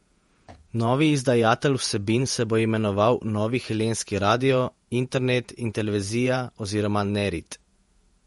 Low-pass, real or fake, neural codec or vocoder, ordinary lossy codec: 19.8 kHz; fake; vocoder, 48 kHz, 128 mel bands, Vocos; MP3, 48 kbps